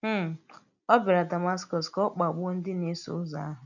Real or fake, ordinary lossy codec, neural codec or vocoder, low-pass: real; none; none; 7.2 kHz